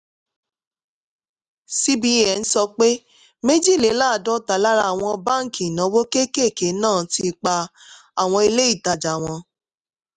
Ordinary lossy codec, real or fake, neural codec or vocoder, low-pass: none; real; none; 10.8 kHz